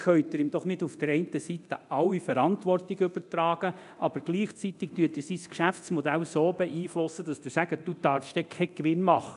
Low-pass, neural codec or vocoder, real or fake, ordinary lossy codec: 10.8 kHz; codec, 24 kHz, 0.9 kbps, DualCodec; fake; none